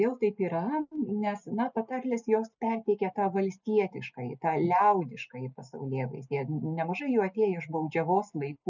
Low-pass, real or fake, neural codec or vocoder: 7.2 kHz; real; none